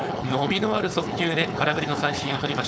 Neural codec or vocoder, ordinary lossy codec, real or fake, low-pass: codec, 16 kHz, 4.8 kbps, FACodec; none; fake; none